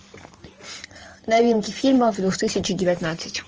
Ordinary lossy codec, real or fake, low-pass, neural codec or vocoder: Opus, 24 kbps; fake; 7.2 kHz; vocoder, 44.1 kHz, 128 mel bands every 512 samples, BigVGAN v2